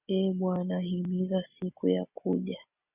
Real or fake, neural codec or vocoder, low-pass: real; none; 3.6 kHz